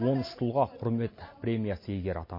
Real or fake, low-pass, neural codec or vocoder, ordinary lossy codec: real; 5.4 kHz; none; MP3, 24 kbps